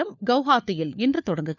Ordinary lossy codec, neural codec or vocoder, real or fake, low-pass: none; codec, 16 kHz, 4 kbps, FunCodec, trained on LibriTTS, 50 frames a second; fake; 7.2 kHz